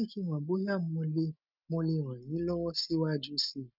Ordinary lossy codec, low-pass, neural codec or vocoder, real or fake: none; 5.4 kHz; none; real